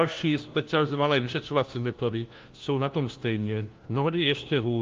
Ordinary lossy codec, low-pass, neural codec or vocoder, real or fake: Opus, 24 kbps; 7.2 kHz; codec, 16 kHz, 1 kbps, FunCodec, trained on LibriTTS, 50 frames a second; fake